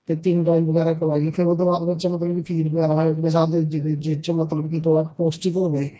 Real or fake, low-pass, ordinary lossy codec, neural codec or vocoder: fake; none; none; codec, 16 kHz, 1 kbps, FreqCodec, smaller model